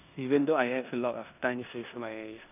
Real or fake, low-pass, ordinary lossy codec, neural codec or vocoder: fake; 3.6 kHz; none; codec, 16 kHz in and 24 kHz out, 0.9 kbps, LongCat-Audio-Codec, four codebook decoder